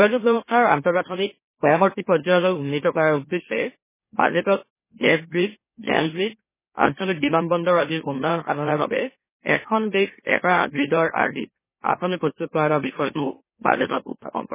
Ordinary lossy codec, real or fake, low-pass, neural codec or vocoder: MP3, 16 kbps; fake; 3.6 kHz; autoencoder, 44.1 kHz, a latent of 192 numbers a frame, MeloTTS